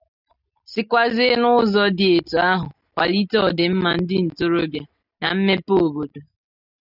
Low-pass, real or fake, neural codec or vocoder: 5.4 kHz; real; none